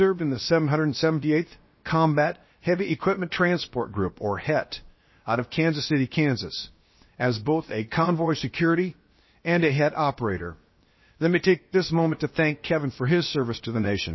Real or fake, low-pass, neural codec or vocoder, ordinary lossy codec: fake; 7.2 kHz; codec, 16 kHz, 0.7 kbps, FocalCodec; MP3, 24 kbps